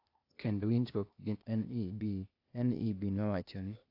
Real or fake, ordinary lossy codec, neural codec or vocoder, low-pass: fake; AAC, 48 kbps; codec, 16 kHz, 0.8 kbps, ZipCodec; 5.4 kHz